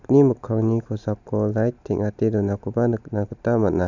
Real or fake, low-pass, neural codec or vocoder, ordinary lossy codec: real; 7.2 kHz; none; none